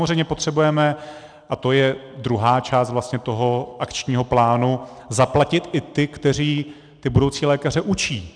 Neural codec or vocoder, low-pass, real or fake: none; 9.9 kHz; real